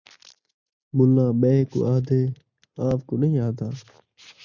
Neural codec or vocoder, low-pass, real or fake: none; 7.2 kHz; real